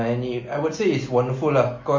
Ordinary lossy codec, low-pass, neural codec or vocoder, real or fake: MP3, 32 kbps; 7.2 kHz; none; real